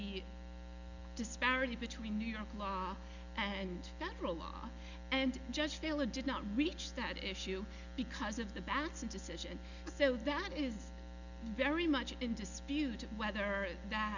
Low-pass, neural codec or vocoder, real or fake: 7.2 kHz; none; real